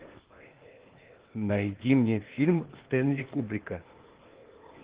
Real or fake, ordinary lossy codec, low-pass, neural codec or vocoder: fake; Opus, 16 kbps; 3.6 kHz; codec, 16 kHz, 0.8 kbps, ZipCodec